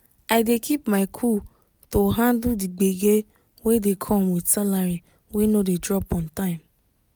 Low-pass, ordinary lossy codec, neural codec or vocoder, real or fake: none; none; none; real